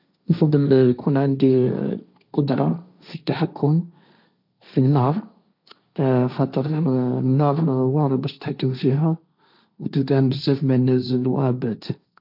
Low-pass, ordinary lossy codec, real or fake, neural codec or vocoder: 5.4 kHz; none; fake; codec, 16 kHz, 1.1 kbps, Voila-Tokenizer